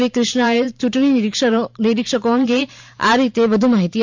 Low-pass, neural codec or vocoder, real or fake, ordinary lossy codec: 7.2 kHz; vocoder, 44.1 kHz, 128 mel bands every 512 samples, BigVGAN v2; fake; MP3, 64 kbps